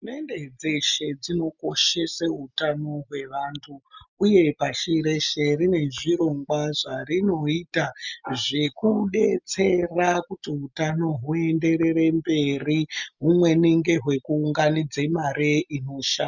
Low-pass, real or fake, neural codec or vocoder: 7.2 kHz; real; none